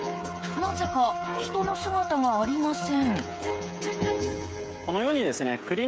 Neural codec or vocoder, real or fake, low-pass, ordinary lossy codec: codec, 16 kHz, 8 kbps, FreqCodec, smaller model; fake; none; none